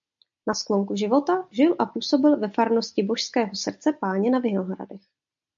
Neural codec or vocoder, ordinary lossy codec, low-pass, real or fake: none; MP3, 64 kbps; 7.2 kHz; real